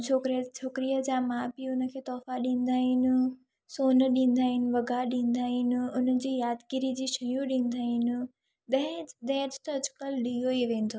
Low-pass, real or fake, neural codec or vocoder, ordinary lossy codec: none; real; none; none